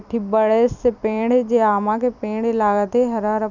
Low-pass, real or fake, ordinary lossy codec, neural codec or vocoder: 7.2 kHz; fake; none; vocoder, 44.1 kHz, 128 mel bands every 256 samples, BigVGAN v2